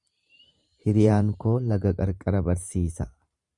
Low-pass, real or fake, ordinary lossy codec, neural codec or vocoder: 10.8 kHz; fake; Opus, 64 kbps; vocoder, 44.1 kHz, 128 mel bands every 512 samples, BigVGAN v2